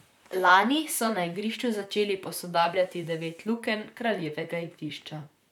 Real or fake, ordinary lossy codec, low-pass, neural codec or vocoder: fake; none; 19.8 kHz; vocoder, 44.1 kHz, 128 mel bands, Pupu-Vocoder